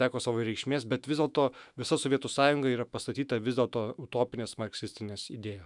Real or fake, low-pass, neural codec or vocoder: fake; 10.8 kHz; autoencoder, 48 kHz, 128 numbers a frame, DAC-VAE, trained on Japanese speech